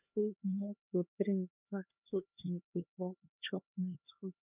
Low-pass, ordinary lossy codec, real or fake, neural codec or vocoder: 3.6 kHz; none; fake; codec, 16 kHz, 2 kbps, X-Codec, HuBERT features, trained on LibriSpeech